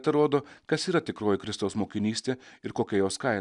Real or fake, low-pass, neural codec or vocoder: real; 10.8 kHz; none